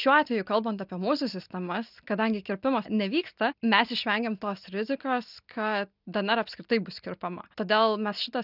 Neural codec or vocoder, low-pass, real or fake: none; 5.4 kHz; real